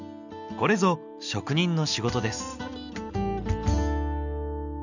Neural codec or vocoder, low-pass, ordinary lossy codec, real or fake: none; 7.2 kHz; none; real